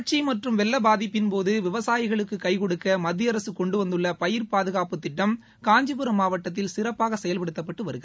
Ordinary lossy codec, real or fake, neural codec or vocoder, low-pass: none; real; none; none